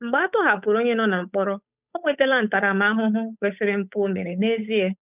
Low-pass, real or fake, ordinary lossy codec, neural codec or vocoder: 3.6 kHz; fake; none; codec, 16 kHz, 8 kbps, FunCodec, trained on Chinese and English, 25 frames a second